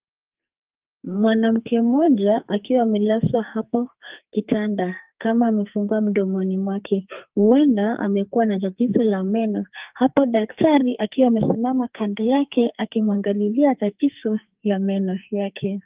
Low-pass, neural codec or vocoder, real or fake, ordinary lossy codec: 3.6 kHz; codec, 44.1 kHz, 2.6 kbps, SNAC; fake; Opus, 32 kbps